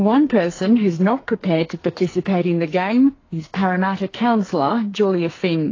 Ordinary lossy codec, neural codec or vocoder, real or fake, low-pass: AAC, 32 kbps; codec, 44.1 kHz, 2.6 kbps, SNAC; fake; 7.2 kHz